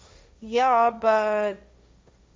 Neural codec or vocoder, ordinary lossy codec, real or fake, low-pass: codec, 16 kHz, 1.1 kbps, Voila-Tokenizer; none; fake; none